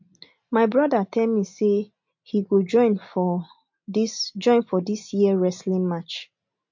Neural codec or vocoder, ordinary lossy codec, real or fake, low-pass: none; MP3, 48 kbps; real; 7.2 kHz